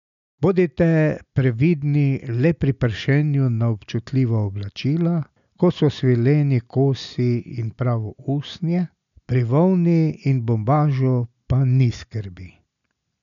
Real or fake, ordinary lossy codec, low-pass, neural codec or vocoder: real; none; 7.2 kHz; none